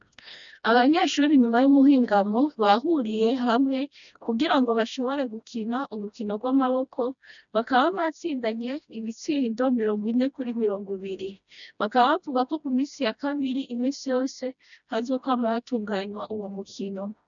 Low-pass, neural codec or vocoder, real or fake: 7.2 kHz; codec, 16 kHz, 1 kbps, FreqCodec, smaller model; fake